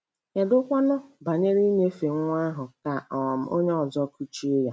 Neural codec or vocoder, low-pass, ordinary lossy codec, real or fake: none; none; none; real